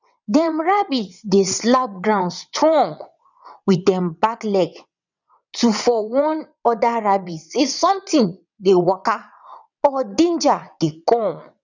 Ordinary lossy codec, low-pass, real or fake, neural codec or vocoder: none; 7.2 kHz; fake; vocoder, 22.05 kHz, 80 mel bands, WaveNeXt